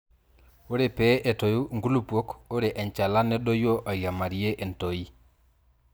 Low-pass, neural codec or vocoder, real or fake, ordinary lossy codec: none; none; real; none